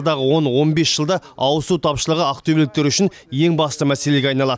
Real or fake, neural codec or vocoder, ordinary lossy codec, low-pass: real; none; none; none